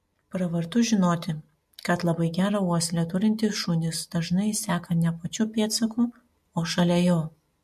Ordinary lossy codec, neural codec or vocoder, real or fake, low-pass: MP3, 64 kbps; none; real; 14.4 kHz